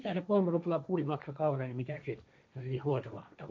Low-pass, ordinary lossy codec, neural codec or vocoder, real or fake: none; none; codec, 16 kHz, 1.1 kbps, Voila-Tokenizer; fake